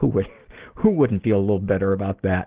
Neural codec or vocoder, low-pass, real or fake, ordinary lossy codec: none; 3.6 kHz; real; Opus, 16 kbps